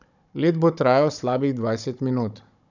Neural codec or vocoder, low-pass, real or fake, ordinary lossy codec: codec, 16 kHz, 16 kbps, FunCodec, trained on Chinese and English, 50 frames a second; 7.2 kHz; fake; none